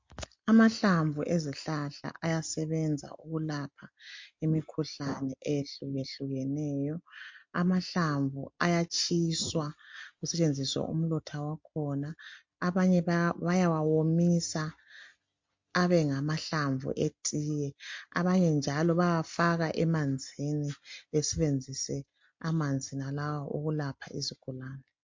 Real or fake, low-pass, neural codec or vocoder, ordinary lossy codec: real; 7.2 kHz; none; MP3, 48 kbps